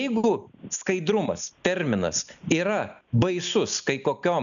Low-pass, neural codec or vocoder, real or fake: 7.2 kHz; none; real